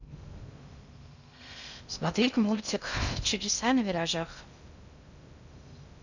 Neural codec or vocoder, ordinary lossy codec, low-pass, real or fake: codec, 16 kHz in and 24 kHz out, 0.6 kbps, FocalCodec, streaming, 2048 codes; none; 7.2 kHz; fake